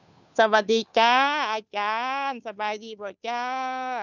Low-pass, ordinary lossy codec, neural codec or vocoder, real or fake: 7.2 kHz; none; codec, 16 kHz, 2 kbps, FunCodec, trained on Chinese and English, 25 frames a second; fake